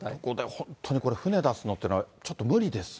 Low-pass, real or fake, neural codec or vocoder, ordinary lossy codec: none; real; none; none